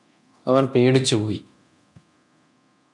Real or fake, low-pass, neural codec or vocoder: fake; 10.8 kHz; codec, 24 kHz, 0.9 kbps, DualCodec